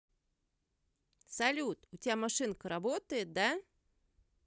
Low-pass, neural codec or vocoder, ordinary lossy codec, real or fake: none; none; none; real